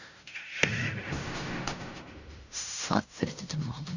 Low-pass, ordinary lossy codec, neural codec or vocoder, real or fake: 7.2 kHz; none; codec, 16 kHz in and 24 kHz out, 0.4 kbps, LongCat-Audio-Codec, fine tuned four codebook decoder; fake